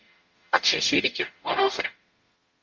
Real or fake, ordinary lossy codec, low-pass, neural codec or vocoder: fake; Opus, 32 kbps; 7.2 kHz; codec, 44.1 kHz, 0.9 kbps, DAC